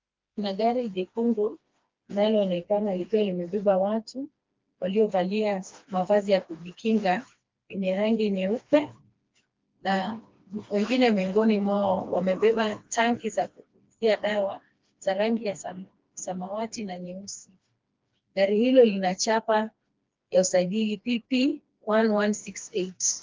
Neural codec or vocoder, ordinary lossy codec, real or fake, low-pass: codec, 16 kHz, 2 kbps, FreqCodec, smaller model; Opus, 24 kbps; fake; 7.2 kHz